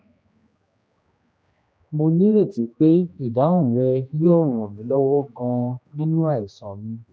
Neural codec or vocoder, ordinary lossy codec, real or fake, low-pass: codec, 16 kHz, 1 kbps, X-Codec, HuBERT features, trained on general audio; none; fake; none